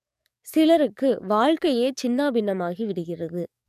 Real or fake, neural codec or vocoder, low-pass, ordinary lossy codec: fake; codec, 44.1 kHz, 7.8 kbps, DAC; 14.4 kHz; none